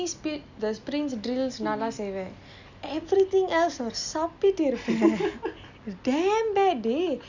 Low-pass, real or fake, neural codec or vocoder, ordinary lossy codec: 7.2 kHz; real; none; none